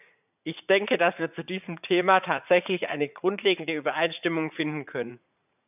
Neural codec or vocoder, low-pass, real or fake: vocoder, 44.1 kHz, 80 mel bands, Vocos; 3.6 kHz; fake